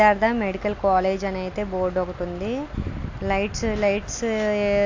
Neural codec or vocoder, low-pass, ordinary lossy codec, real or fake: none; 7.2 kHz; AAC, 48 kbps; real